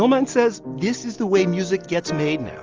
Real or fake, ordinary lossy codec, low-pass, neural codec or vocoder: real; Opus, 24 kbps; 7.2 kHz; none